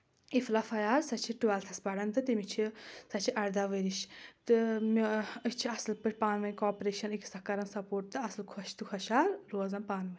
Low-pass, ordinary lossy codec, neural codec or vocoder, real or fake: none; none; none; real